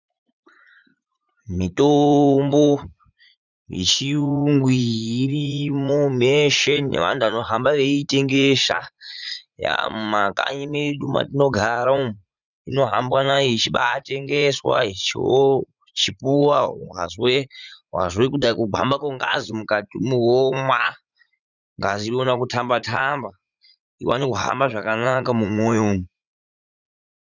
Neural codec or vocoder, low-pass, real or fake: vocoder, 44.1 kHz, 80 mel bands, Vocos; 7.2 kHz; fake